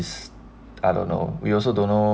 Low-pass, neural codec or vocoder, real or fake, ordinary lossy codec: none; none; real; none